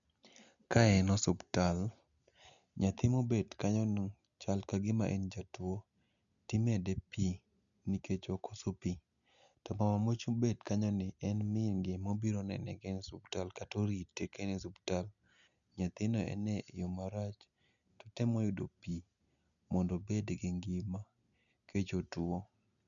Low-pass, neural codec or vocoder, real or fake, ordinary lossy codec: 7.2 kHz; none; real; none